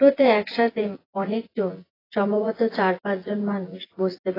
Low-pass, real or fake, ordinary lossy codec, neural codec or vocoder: 5.4 kHz; fake; AAC, 24 kbps; vocoder, 24 kHz, 100 mel bands, Vocos